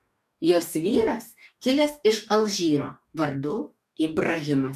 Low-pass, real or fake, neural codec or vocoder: 14.4 kHz; fake; codec, 44.1 kHz, 2.6 kbps, DAC